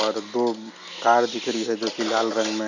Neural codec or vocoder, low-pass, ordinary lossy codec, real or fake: none; 7.2 kHz; none; real